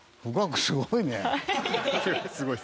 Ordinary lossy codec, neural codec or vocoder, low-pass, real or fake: none; none; none; real